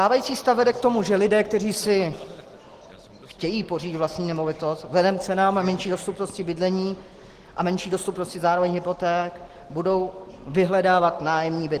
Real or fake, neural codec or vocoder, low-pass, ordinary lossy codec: real; none; 14.4 kHz; Opus, 16 kbps